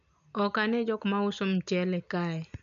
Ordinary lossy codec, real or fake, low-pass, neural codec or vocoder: none; real; 7.2 kHz; none